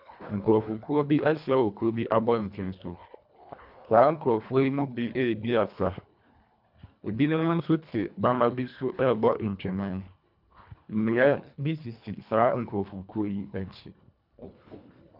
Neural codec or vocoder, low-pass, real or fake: codec, 24 kHz, 1.5 kbps, HILCodec; 5.4 kHz; fake